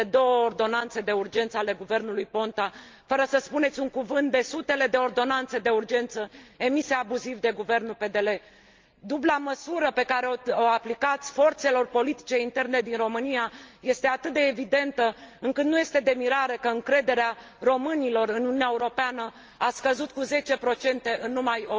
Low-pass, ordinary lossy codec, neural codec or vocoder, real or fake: 7.2 kHz; Opus, 24 kbps; none; real